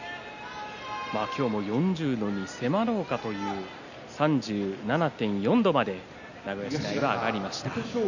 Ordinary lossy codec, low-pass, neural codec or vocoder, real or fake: none; 7.2 kHz; none; real